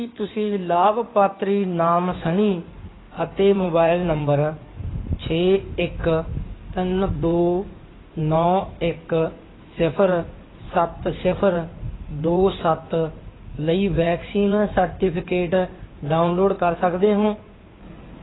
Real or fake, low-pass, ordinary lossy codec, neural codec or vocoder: fake; 7.2 kHz; AAC, 16 kbps; codec, 16 kHz in and 24 kHz out, 2.2 kbps, FireRedTTS-2 codec